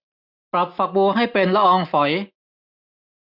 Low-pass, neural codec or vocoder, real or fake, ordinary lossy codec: 5.4 kHz; none; real; none